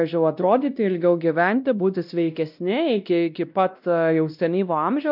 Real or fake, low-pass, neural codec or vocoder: fake; 5.4 kHz; codec, 16 kHz, 1 kbps, X-Codec, WavLM features, trained on Multilingual LibriSpeech